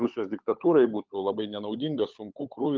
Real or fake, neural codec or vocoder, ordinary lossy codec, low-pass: fake; codec, 16 kHz, 16 kbps, FunCodec, trained on LibriTTS, 50 frames a second; Opus, 32 kbps; 7.2 kHz